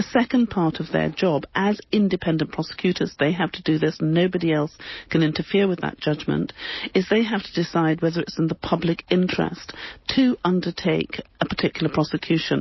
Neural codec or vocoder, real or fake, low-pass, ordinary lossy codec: none; real; 7.2 kHz; MP3, 24 kbps